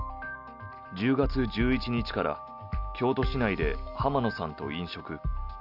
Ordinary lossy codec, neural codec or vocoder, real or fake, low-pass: none; none; real; 5.4 kHz